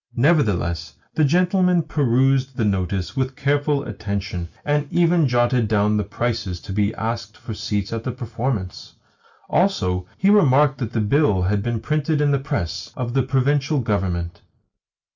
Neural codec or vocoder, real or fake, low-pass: none; real; 7.2 kHz